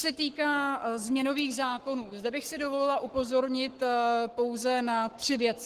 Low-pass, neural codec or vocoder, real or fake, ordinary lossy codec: 14.4 kHz; codec, 44.1 kHz, 7.8 kbps, Pupu-Codec; fake; Opus, 16 kbps